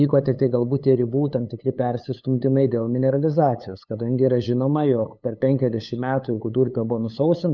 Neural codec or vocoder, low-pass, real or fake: codec, 16 kHz, 8 kbps, FunCodec, trained on LibriTTS, 25 frames a second; 7.2 kHz; fake